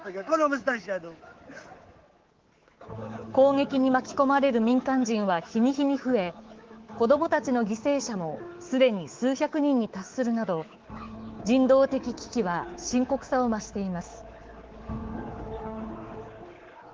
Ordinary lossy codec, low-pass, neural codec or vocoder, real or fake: Opus, 16 kbps; 7.2 kHz; codec, 24 kHz, 3.1 kbps, DualCodec; fake